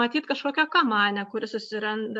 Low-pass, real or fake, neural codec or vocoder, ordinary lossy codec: 10.8 kHz; real; none; MP3, 64 kbps